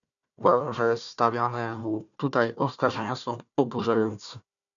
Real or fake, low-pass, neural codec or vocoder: fake; 7.2 kHz; codec, 16 kHz, 1 kbps, FunCodec, trained on Chinese and English, 50 frames a second